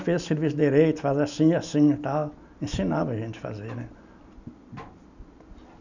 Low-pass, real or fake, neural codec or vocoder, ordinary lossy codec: 7.2 kHz; real; none; none